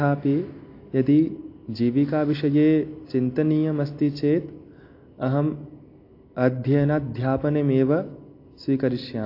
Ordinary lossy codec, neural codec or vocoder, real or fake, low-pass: AAC, 32 kbps; none; real; 5.4 kHz